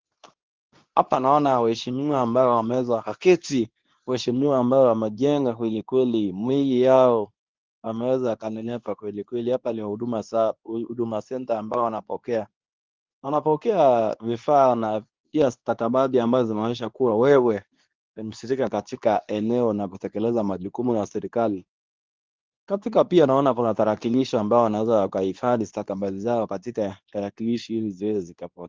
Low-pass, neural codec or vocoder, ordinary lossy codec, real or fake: 7.2 kHz; codec, 24 kHz, 0.9 kbps, WavTokenizer, medium speech release version 2; Opus, 32 kbps; fake